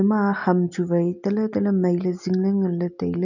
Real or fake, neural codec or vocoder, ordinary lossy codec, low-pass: real; none; none; 7.2 kHz